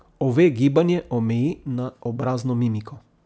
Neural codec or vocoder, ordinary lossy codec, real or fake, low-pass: none; none; real; none